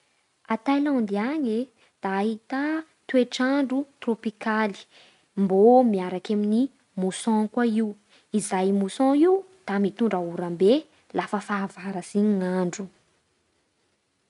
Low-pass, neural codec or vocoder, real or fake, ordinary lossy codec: 10.8 kHz; none; real; none